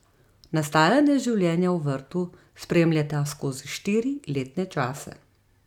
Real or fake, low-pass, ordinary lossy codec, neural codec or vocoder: real; 19.8 kHz; none; none